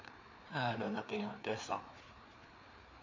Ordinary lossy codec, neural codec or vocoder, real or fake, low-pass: MP3, 48 kbps; codec, 16 kHz, 4 kbps, FreqCodec, larger model; fake; 7.2 kHz